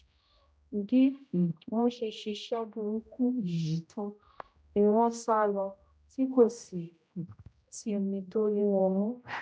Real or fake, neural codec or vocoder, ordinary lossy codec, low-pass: fake; codec, 16 kHz, 0.5 kbps, X-Codec, HuBERT features, trained on general audio; none; none